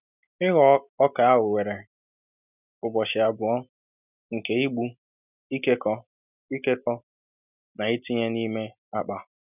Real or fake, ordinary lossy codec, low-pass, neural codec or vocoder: real; none; 3.6 kHz; none